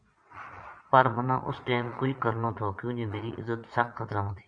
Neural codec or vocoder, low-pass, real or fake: vocoder, 22.05 kHz, 80 mel bands, Vocos; 9.9 kHz; fake